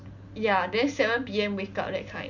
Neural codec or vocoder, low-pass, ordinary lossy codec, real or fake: none; 7.2 kHz; none; real